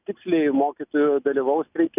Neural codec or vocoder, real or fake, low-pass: none; real; 3.6 kHz